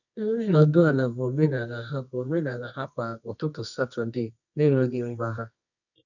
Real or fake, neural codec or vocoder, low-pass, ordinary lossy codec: fake; codec, 24 kHz, 0.9 kbps, WavTokenizer, medium music audio release; 7.2 kHz; none